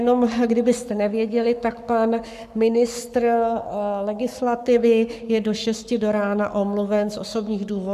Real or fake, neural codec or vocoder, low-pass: fake; codec, 44.1 kHz, 7.8 kbps, Pupu-Codec; 14.4 kHz